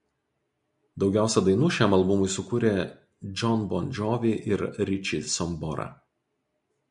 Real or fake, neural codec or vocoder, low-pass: real; none; 10.8 kHz